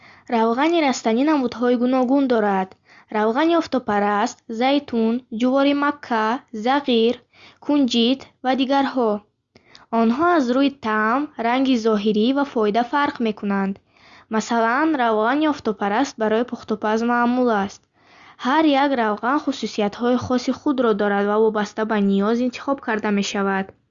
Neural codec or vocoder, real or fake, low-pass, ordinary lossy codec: none; real; 7.2 kHz; Opus, 64 kbps